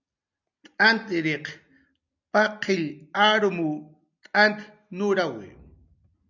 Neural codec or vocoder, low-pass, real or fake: none; 7.2 kHz; real